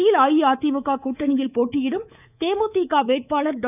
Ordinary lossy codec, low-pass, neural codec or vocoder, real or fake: none; 3.6 kHz; codec, 24 kHz, 3.1 kbps, DualCodec; fake